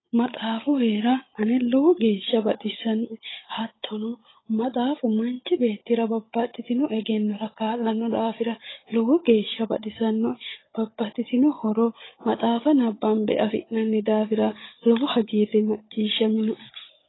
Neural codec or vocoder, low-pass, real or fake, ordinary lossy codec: codec, 16 kHz, 16 kbps, FunCodec, trained on Chinese and English, 50 frames a second; 7.2 kHz; fake; AAC, 16 kbps